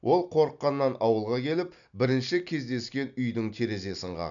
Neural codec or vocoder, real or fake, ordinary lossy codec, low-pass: none; real; none; 7.2 kHz